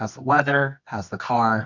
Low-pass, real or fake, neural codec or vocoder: 7.2 kHz; fake; codec, 24 kHz, 0.9 kbps, WavTokenizer, medium music audio release